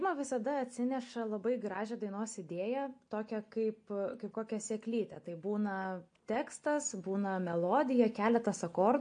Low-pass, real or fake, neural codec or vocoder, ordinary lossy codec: 9.9 kHz; real; none; MP3, 48 kbps